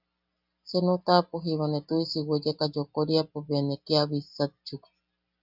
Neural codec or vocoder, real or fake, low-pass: none; real; 5.4 kHz